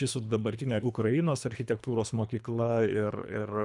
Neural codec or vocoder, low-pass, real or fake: codec, 24 kHz, 3 kbps, HILCodec; 10.8 kHz; fake